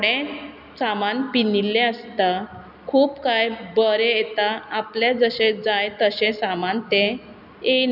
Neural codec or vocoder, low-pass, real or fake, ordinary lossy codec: none; 5.4 kHz; real; none